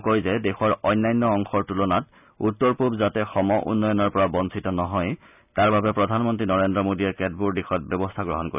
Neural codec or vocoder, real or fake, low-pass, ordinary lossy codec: none; real; 3.6 kHz; none